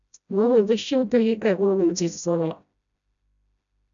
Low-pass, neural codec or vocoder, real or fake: 7.2 kHz; codec, 16 kHz, 0.5 kbps, FreqCodec, smaller model; fake